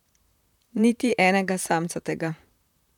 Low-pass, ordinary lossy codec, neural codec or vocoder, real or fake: 19.8 kHz; none; vocoder, 44.1 kHz, 128 mel bands, Pupu-Vocoder; fake